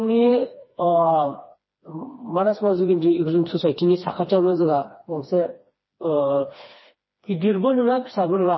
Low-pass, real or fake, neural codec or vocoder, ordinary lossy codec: 7.2 kHz; fake; codec, 16 kHz, 2 kbps, FreqCodec, smaller model; MP3, 24 kbps